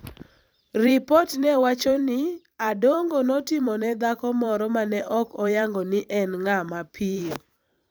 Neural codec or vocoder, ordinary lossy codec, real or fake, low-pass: vocoder, 44.1 kHz, 128 mel bands every 512 samples, BigVGAN v2; none; fake; none